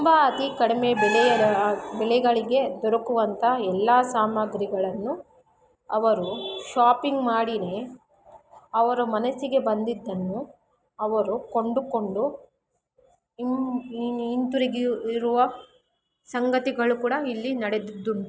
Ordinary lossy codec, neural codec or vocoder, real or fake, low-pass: none; none; real; none